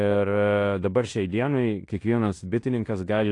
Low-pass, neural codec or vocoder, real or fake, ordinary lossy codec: 10.8 kHz; codec, 16 kHz in and 24 kHz out, 0.9 kbps, LongCat-Audio-Codec, fine tuned four codebook decoder; fake; AAC, 48 kbps